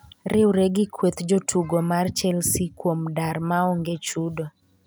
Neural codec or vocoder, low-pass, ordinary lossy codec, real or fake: none; none; none; real